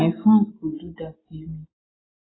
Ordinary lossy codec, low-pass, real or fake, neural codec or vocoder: AAC, 16 kbps; 7.2 kHz; real; none